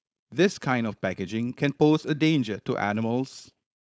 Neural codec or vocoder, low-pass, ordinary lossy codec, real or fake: codec, 16 kHz, 4.8 kbps, FACodec; none; none; fake